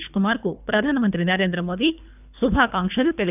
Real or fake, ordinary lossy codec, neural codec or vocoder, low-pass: fake; none; codec, 24 kHz, 3 kbps, HILCodec; 3.6 kHz